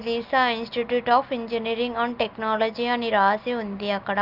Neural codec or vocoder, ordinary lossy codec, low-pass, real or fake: none; Opus, 32 kbps; 5.4 kHz; real